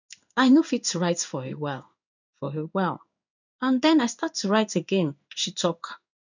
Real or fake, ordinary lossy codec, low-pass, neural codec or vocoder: fake; none; 7.2 kHz; codec, 16 kHz in and 24 kHz out, 1 kbps, XY-Tokenizer